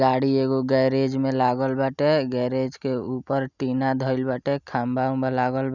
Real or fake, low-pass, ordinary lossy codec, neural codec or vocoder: real; 7.2 kHz; none; none